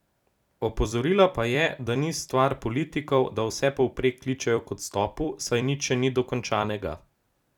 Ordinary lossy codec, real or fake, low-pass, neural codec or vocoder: none; fake; 19.8 kHz; vocoder, 44.1 kHz, 128 mel bands every 256 samples, BigVGAN v2